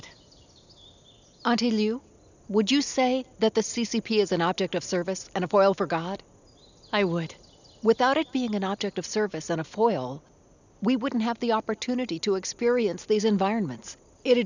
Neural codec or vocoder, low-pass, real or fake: none; 7.2 kHz; real